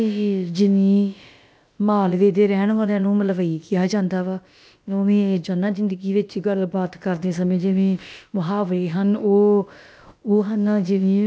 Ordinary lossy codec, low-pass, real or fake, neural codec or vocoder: none; none; fake; codec, 16 kHz, about 1 kbps, DyCAST, with the encoder's durations